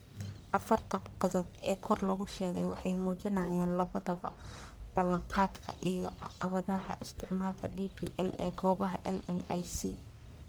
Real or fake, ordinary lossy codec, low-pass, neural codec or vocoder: fake; none; none; codec, 44.1 kHz, 1.7 kbps, Pupu-Codec